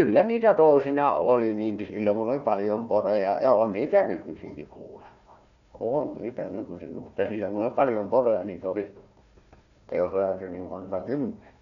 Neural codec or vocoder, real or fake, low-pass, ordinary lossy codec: codec, 16 kHz, 1 kbps, FunCodec, trained on Chinese and English, 50 frames a second; fake; 7.2 kHz; none